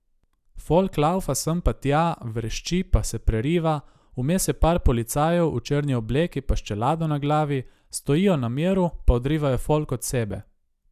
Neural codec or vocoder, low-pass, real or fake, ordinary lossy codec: none; 14.4 kHz; real; none